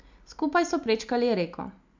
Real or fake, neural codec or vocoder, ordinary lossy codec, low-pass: real; none; MP3, 64 kbps; 7.2 kHz